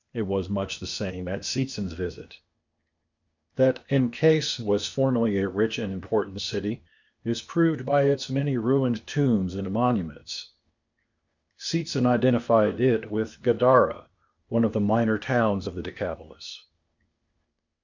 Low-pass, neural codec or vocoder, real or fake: 7.2 kHz; codec, 16 kHz, 0.8 kbps, ZipCodec; fake